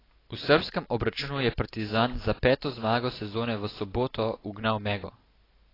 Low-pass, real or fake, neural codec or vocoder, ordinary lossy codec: 5.4 kHz; real; none; AAC, 24 kbps